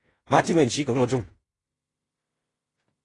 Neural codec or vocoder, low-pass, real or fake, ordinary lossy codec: codec, 16 kHz in and 24 kHz out, 0.9 kbps, LongCat-Audio-Codec, fine tuned four codebook decoder; 10.8 kHz; fake; AAC, 32 kbps